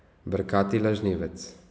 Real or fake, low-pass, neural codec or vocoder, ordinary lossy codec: real; none; none; none